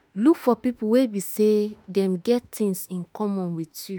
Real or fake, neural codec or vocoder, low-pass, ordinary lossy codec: fake; autoencoder, 48 kHz, 32 numbers a frame, DAC-VAE, trained on Japanese speech; none; none